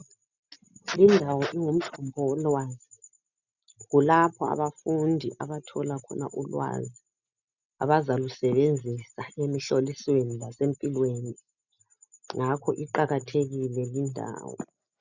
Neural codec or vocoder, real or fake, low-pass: none; real; 7.2 kHz